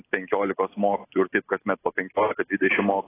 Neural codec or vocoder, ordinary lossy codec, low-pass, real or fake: none; AAC, 16 kbps; 3.6 kHz; real